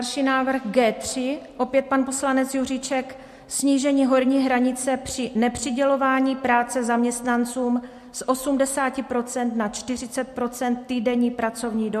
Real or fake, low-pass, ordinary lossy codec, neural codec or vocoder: real; 14.4 kHz; MP3, 64 kbps; none